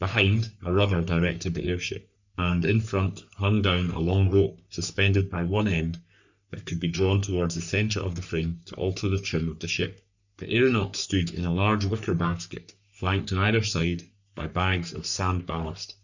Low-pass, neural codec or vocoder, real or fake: 7.2 kHz; codec, 44.1 kHz, 3.4 kbps, Pupu-Codec; fake